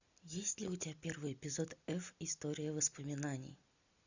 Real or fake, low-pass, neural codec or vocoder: real; 7.2 kHz; none